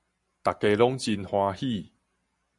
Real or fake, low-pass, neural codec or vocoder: real; 10.8 kHz; none